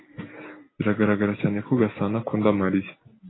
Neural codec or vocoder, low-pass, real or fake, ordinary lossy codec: none; 7.2 kHz; real; AAC, 16 kbps